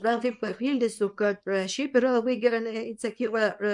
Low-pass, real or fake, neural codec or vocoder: 10.8 kHz; fake; codec, 24 kHz, 0.9 kbps, WavTokenizer, small release